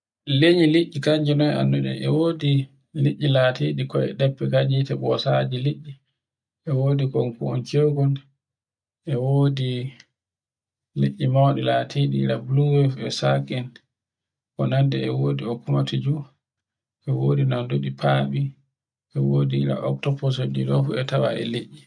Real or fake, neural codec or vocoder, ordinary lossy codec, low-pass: real; none; none; 9.9 kHz